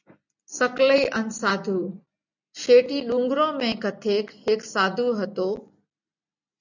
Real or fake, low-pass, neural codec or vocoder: real; 7.2 kHz; none